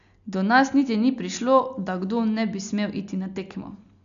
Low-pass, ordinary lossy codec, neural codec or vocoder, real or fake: 7.2 kHz; none; none; real